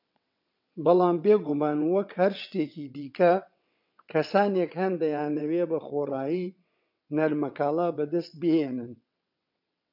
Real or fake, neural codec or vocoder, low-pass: fake; vocoder, 22.05 kHz, 80 mel bands, WaveNeXt; 5.4 kHz